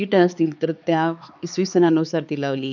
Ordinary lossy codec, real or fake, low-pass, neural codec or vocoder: none; fake; 7.2 kHz; codec, 16 kHz, 4 kbps, X-Codec, HuBERT features, trained on LibriSpeech